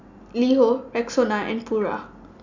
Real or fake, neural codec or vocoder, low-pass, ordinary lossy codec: real; none; 7.2 kHz; none